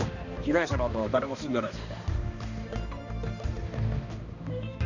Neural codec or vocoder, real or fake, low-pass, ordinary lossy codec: codec, 16 kHz, 2 kbps, X-Codec, HuBERT features, trained on balanced general audio; fake; 7.2 kHz; none